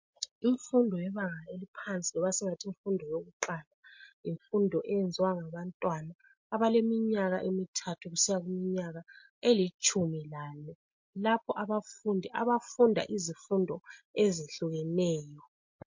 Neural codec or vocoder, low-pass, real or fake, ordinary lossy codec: none; 7.2 kHz; real; MP3, 48 kbps